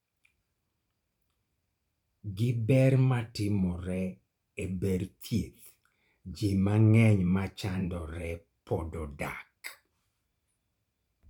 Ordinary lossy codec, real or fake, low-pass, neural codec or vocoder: none; fake; 19.8 kHz; vocoder, 44.1 kHz, 128 mel bands every 512 samples, BigVGAN v2